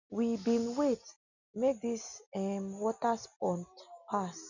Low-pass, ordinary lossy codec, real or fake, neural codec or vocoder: 7.2 kHz; none; real; none